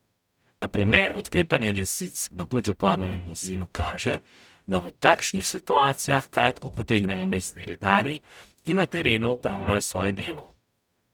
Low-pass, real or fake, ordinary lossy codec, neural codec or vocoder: 19.8 kHz; fake; none; codec, 44.1 kHz, 0.9 kbps, DAC